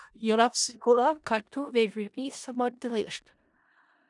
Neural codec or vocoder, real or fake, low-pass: codec, 16 kHz in and 24 kHz out, 0.4 kbps, LongCat-Audio-Codec, four codebook decoder; fake; 10.8 kHz